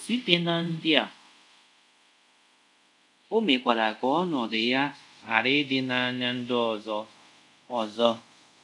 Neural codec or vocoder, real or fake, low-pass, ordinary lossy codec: codec, 24 kHz, 0.5 kbps, DualCodec; fake; 10.8 kHz; none